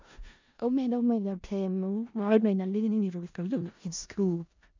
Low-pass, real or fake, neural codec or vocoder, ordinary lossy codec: 7.2 kHz; fake; codec, 16 kHz in and 24 kHz out, 0.4 kbps, LongCat-Audio-Codec, four codebook decoder; MP3, 64 kbps